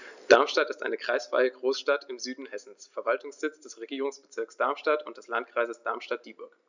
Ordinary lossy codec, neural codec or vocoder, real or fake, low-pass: none; none; real; 7.2 kHz